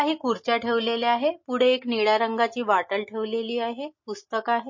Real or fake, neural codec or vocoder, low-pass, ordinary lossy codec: real; none; 7.2 kHz; MP3, 32 kbps